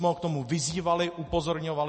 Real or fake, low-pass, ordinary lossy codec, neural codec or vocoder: real; 10.8 kHz; MP3, 32 kbps; none